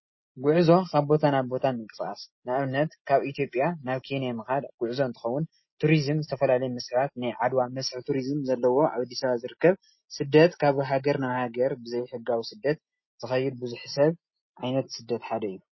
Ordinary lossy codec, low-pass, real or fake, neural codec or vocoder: MP3, 24 kbps; 7.2 kHz; real; none